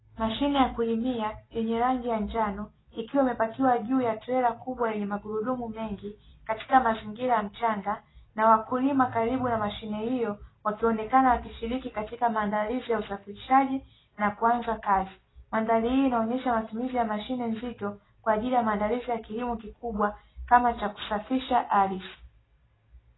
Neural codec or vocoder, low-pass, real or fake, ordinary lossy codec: none; 7.2 kHz; real; AAC, 16 kbps